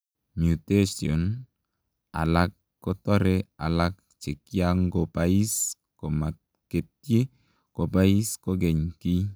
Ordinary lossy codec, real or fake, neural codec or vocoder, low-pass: none; real; none; none